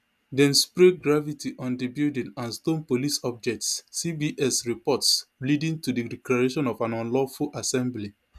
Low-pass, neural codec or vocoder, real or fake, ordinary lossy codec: 14.4 kHz; none; real; none